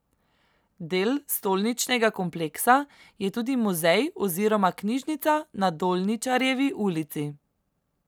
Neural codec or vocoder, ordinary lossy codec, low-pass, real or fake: none; none; none; real